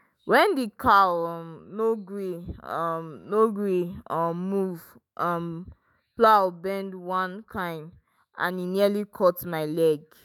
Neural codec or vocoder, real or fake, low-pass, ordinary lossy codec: autoencoder, 48 kHz, 128 numbers a frame, DAC-VAE, trained on Japanese speech; fake; none; none